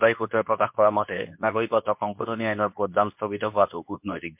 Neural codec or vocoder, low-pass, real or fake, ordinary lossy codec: codec, 24 kHz, 0.9 kbps, WavTokenizer, medium speech release version 2; 3.6 kHz; fake; MP3, 32 kbps